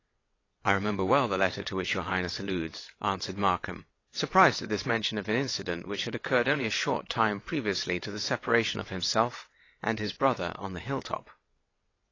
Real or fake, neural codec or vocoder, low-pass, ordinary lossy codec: fake; vocoder, 22.05 kHz, 80 mel bands, WaveNeXt; 7.2 kHz; AAC, 32 kbps